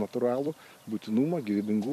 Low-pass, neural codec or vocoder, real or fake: 14.4 kHz; vocoder, 44.1 kHz, 128 mel bands every 256 samples, BigVGAN v2; fake